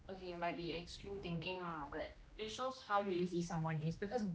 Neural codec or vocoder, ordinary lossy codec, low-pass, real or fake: codec, 16 kHz, 1 kbps, X-Codec, HuBERT features, trained on general audio; none; none; fake